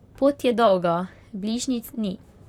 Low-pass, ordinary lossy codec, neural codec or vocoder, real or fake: 19.8 kHz; none; vocoder, 44.1 kHz, 128 mel bands, Pupu-Vocoder; fake